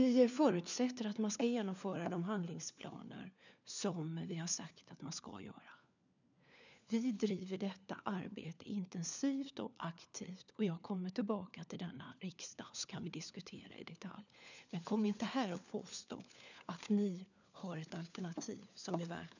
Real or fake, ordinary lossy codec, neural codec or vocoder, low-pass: fake; none; codec, 16 kHz, 4 kbps, FunCodec, trained on LibriTTS, 50 frames a second; 7.2 kHz